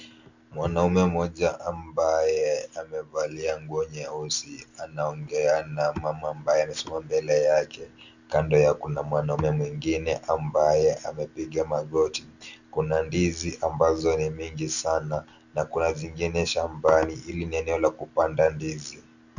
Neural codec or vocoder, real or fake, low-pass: none; real; 7.2 kHz